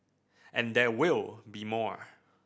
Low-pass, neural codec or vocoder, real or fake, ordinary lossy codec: none; none; real; none